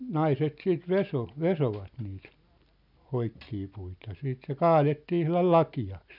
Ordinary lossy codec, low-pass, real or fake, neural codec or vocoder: none; 5.4 kHz; real; none